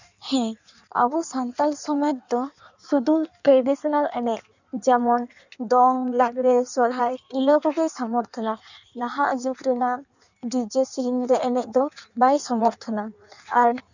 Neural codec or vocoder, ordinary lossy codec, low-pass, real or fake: codec, 16 kHz in and 24 kHz out, 1.1 kbps, FireRedTTS-2 codec; none; 7.2 kHz; fake